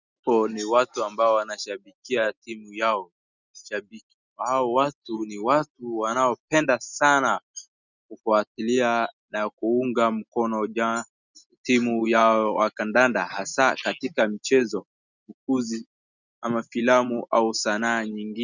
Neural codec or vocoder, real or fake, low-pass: none; real; 7.2 kHz